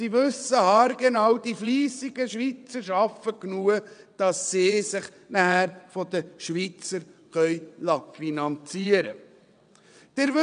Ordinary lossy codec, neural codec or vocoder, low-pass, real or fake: none; vocoder, 22.05 kHz, 80 mel bands, WaveNeXt; 9.9 kHz; fake